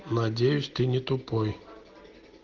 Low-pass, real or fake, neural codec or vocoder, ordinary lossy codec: 7.2 kHz; real; none; Opus, 16 kbps